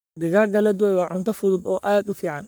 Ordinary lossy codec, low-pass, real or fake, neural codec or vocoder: none; none; fake; codec, 44.1 kHz, 3.4 kbps, Pupu-Codec